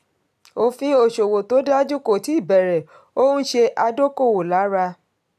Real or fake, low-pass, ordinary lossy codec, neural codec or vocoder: real; 14.4 kHz; none; none